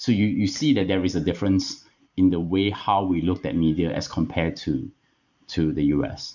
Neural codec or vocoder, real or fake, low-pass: vocoder, 44.1 kHz, 128 mel bands every 512 samples, BigVGAN v2; fake; 7.2 kHz